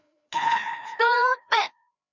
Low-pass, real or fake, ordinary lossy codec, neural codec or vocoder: 7.2 kHz; fake; none; codec, 16 kHz, 2 kbps, FreqCodec, larger model